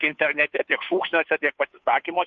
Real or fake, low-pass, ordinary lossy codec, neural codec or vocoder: fake; 7.2 kHz; MP3, 48 kbps; codec, 16 kHz, 2 kbps, FunCodec, trained on Chinese and English, 25 frames a second